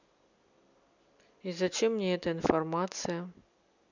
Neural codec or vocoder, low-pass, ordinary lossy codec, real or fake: none; 7.2 kHz; none; real